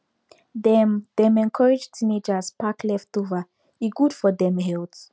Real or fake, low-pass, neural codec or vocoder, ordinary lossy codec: real; none; none; none